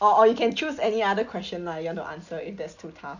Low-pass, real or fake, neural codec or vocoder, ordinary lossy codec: 7.2 kHz; real; none; none